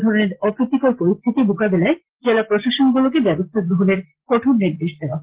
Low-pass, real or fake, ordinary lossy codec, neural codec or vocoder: 3.6 kHz; real; Opus, 16 kbps; none